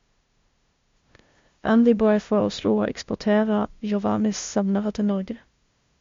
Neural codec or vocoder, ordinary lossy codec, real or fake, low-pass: codec, 16 kHz, 0.5 kbps, FunCodec, trained on LibriTTS, 25 frames a second; MP3, 48 kbps; fake; 7.2 kHz